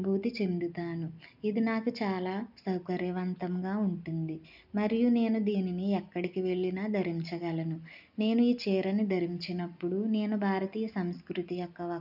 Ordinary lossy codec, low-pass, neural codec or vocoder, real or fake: none; 5.4 kHz; none; real